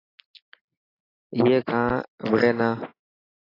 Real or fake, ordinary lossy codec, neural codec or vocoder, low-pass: real; AAC, 24 kbps; none; 5.4 kHz